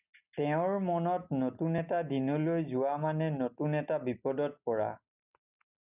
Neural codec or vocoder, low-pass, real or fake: none; 3.6 kHz; real